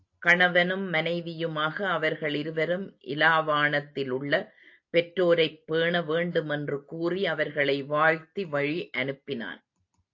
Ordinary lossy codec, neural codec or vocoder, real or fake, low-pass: AAC, 48 kbps; none; real; 7.2 kHz